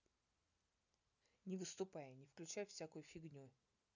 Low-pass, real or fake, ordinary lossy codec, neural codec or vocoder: 7.2 kHz; real; none; none